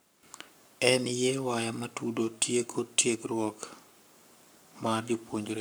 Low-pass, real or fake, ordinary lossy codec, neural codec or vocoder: none; fake; none; codec, 44.1 kHz, 7.8 kbps, Pupu-Codec